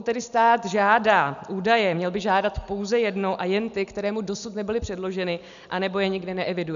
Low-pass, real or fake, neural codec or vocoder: 7.2 kHz; real; none